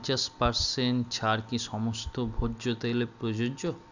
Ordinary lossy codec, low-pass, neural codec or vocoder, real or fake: none; 7.2 kHz; none; real